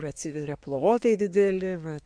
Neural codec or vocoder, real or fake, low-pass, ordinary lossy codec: codec, 24 kHz, 1 kbps, SNAC; fake; 9.9 kHz; MP3, 64 kbps